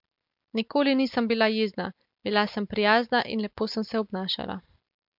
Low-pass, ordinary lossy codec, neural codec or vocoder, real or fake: 5.4 kHz; MP3, 48 kbps; none; real